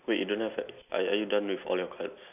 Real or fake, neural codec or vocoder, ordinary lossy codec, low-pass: real; none; none; 3.6 kHz